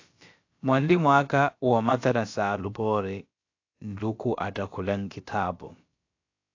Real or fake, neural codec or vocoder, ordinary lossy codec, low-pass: fake; codec, 16 kHz, about 1 kbps, DyCAST, with the encoder's durations; AAC, 48 kbps; 7.2 kHz